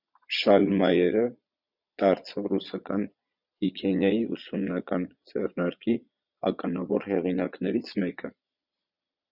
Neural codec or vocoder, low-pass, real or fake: vocoder, 22.05 kHz, 80 mel bands, Vocos; 5.4 kHz; fake